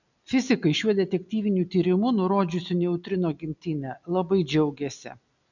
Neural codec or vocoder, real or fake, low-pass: none; real; 7.2 kHz